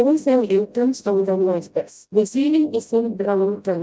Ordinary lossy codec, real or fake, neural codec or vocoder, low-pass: none; fake; codec, 16 kHz, 0.5 kbps, FreqCodec, smaller model; none